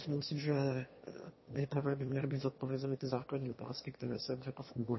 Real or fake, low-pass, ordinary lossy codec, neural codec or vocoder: fake; 7.2 kHz; MP3, 24 kbps; autoencoder, 22.05 kHz, a latent of 192 numbers a frame, VITS, trained on one speaker